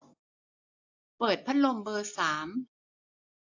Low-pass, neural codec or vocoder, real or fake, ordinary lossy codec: 7.2 kHz; none; real; AAC, 48 kbps